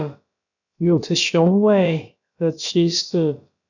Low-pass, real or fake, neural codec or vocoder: 7.2 kHz; fake; codec, 16 kHz, about 1 kbps, DyCAST, with the encoder's durations